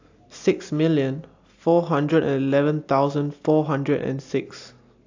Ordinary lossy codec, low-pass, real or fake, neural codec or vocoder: AAC, 48 kbps; 7.2 kHz; real; none